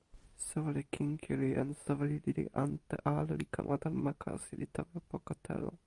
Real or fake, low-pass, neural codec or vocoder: real; 10.8 kHz; none